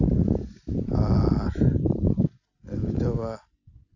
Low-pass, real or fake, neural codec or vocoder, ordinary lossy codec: 7.2 kHz; real; none; none